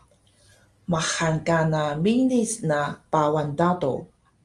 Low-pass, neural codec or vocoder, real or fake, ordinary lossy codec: 10.8 kHz; none; real; Opus, 32 kbps